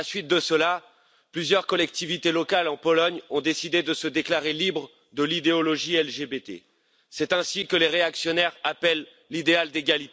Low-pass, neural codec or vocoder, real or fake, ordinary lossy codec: none; none; real; none